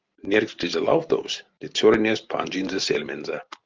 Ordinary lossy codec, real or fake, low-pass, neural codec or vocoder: Opus, 32 kbps; real; 7.2 kHz; none